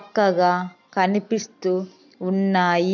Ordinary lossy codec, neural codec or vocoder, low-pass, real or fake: none; none; 7.2 kHz; real